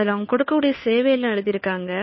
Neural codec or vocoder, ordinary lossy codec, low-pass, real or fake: codec, 16 kHz, 2 kbps, FunCodec, trained on Chinese and English, 25 frames a second; MP3, 24 kbps; 7.2 kHz; fake